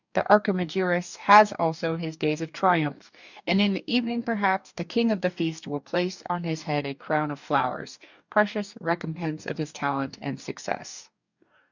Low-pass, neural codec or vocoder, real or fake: 7.2 kHz; codec, 44.1 kHz, 2.6 kbps, DAC; fake